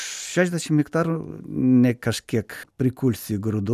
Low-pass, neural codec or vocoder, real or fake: 14.4 kHz; none; real